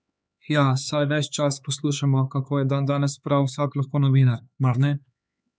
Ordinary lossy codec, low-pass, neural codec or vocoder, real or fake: none; none; codec, 16 kHz, 4 kbps, X-Codec, HuBERT features, trained on LibriSpeech; fake